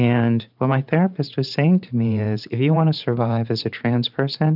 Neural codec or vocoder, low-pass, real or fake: vocoder, 44.1 kHz, 128 mel bands every 512 samples, BigVGAN v2; 5.4 kHz; fake